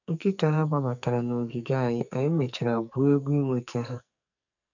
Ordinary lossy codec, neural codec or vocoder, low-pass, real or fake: none; codec, 44.1 kHz, 2.6 kbps, SNAC; 7.2 kHz; fake